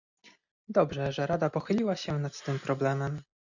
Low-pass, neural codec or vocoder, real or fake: 7.2 kHz; none; real